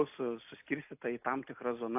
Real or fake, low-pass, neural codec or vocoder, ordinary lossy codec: real; 3.6 kHz; none; AAC, 32 kbps